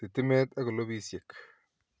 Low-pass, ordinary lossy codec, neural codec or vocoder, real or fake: none; none; none; real